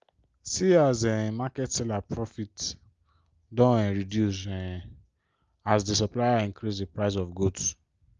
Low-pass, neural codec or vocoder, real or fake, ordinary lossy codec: 7.2 kHz; none; real; Opus, 32 kbps